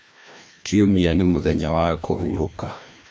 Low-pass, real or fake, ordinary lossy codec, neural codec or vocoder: none; fake; none; codec, 16 kHz, 1 kbps, FreqCodec, larger model